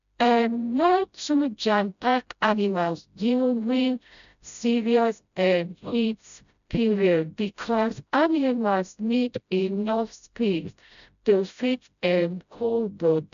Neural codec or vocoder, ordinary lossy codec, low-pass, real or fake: codec, 16 kHz, 0.5 kbps, FreqCodec, smaller model; none; 7.2 kHz; fake